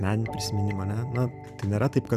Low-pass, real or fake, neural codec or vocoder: 14.4 kHz; fake; vocoder, 44.1 kHz, 128 mel bands every 256 samples, BigVGAN v2